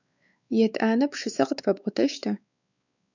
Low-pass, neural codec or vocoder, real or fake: 7.2 kHz; codec, 16 kHz, 4 kbps, X-Codec, WavLM features, trained on Multilingual LibriSpeech; fake